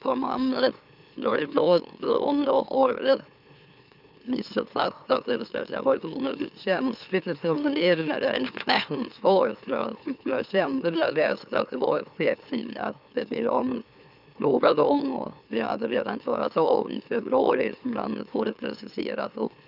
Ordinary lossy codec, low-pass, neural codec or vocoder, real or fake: none; 5.4 kHz; autoencoder, 44.1 kHz, a latent of 192 numbers a frame, MeloTTS; fake